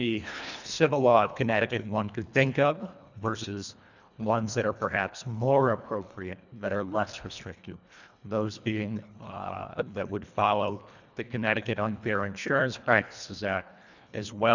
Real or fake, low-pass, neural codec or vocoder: fake; 7.2 kHz; codec, 24 kHz, 1.5 kbps, HILCodec